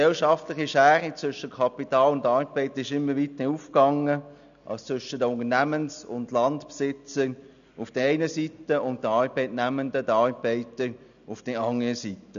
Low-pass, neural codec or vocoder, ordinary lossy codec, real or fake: 7.2 kHz; none; none; real